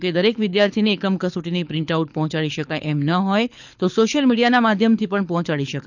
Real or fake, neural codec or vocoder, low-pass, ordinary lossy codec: fake; codec, 24 kHz, 6 kbps, HILCodec; 7.2 kHz; none